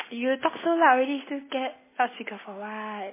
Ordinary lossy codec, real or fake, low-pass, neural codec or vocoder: MP3, 16 kbps; real; 3.6 kHz; none